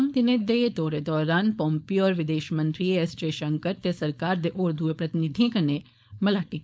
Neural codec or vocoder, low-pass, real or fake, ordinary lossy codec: codec, 16 kHz, 4.8 kbps, FACodec; none; fake; none